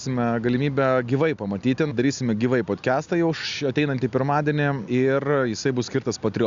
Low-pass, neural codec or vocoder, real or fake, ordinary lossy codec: 7.2 kHz; none; real; Opus, 64 kbps